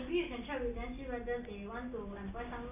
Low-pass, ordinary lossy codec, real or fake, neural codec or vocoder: 3.6 kHz; none; real; none